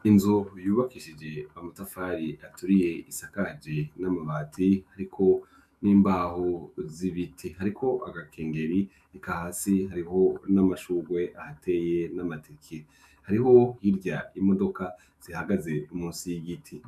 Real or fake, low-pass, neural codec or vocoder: fake; 14.4 kHz; autoencoder, 48 kHz, 128 numbers a frame, DAC-VAE, trained on Japanese speech